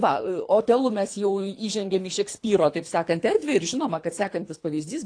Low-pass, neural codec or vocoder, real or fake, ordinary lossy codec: 9.9 kHz; codec, 24 kHz, 3 kbps, HILCodec; fake; AAC, 48 kbps